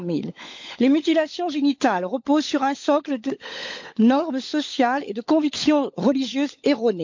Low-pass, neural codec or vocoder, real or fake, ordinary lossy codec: 7.2 kHz; codec, 16 kHz, 16 kbps, FunCodec, trained on LibriTTS, 50 frames a second; fake; MP3, 48 kbps